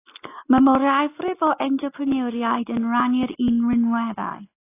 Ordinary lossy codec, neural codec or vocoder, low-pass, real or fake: AAC, 24 kbps; none; 3.6 kHz; real